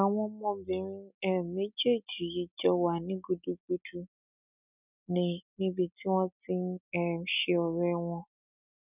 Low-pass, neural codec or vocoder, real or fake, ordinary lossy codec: 3.6 kHz; none; real; none